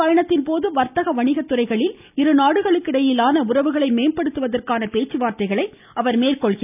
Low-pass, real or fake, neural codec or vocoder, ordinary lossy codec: 3.6 kHz; real; none; none